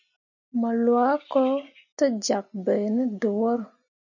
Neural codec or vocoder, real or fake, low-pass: none; real; 7.2 kHz